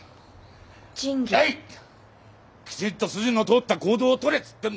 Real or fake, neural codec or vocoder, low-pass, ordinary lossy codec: real; none; none; none